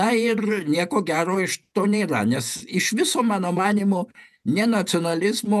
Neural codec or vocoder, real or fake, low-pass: vocoder, 48 kHz, 128 mel bands, Vocos; fake; 14.4 kHz